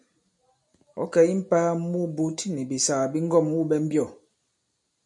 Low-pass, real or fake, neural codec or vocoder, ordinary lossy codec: 10.8 kHz; real; none; MP3, 96 kbps